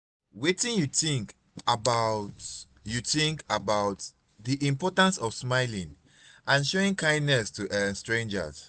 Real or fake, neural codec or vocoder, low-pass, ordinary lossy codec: real; none; none; none